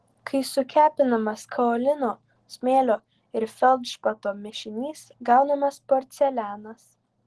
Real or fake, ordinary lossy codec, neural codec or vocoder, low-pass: real; Opus, 16 kbps; none; 10.8 kHz